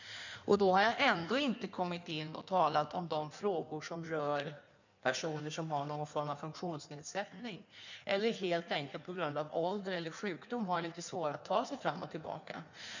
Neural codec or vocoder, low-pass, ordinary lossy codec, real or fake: codec, 16 kHz in and 24 kHz out, 1.1 kbps, FireRedTTS-2 codec; 7.2 kHz; none; fake